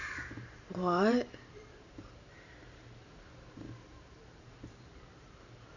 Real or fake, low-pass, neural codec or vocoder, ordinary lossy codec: fake; 7.2 kHz; vocoder, 44.1 kHz, 128 mel bands every 512 samples, BigVGAN v2; none